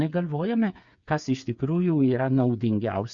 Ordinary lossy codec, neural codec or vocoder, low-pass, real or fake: Opus, 64 kbps; codec, 16 kHz, 8 kbps, FreqCodec, smaller model; 7.2 kHz; fake